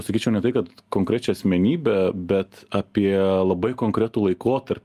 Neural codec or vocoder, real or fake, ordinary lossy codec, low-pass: none; real; Opus, 32 kbps; 14.4 kHz